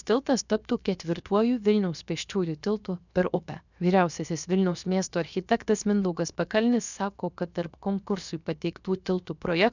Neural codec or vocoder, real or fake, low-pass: codec, 16 kHz, 0.7 kbps, FocalCodec; fake; 7.2 kHz